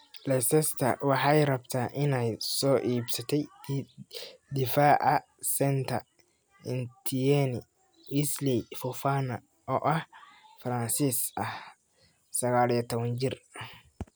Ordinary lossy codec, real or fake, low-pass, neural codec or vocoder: none; real; none; none